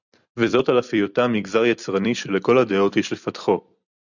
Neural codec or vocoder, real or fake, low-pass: vocoder, 44.1 kHz, 128 mel bands every 256 samples, BigVGAN v2; fake; 7.2 kHz